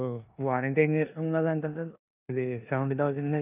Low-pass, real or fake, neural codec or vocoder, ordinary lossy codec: 3.6 kHz; fake; codec, 16 kHz in and 24 kHz out, 0.9 kbps, LongCat-Audio-Codec, four codebook decoder; none